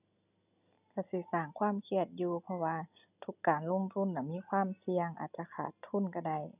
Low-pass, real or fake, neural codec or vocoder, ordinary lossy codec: 3.6 kHz; real; none; none